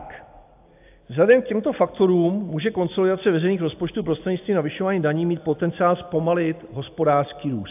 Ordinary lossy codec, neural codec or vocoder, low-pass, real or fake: AAC, 32 kbps; none; 3.6 kHz; real